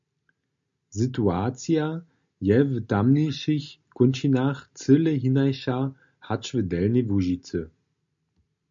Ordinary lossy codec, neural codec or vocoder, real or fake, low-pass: MP3, 64 kbps; none; real; 7.2 kHz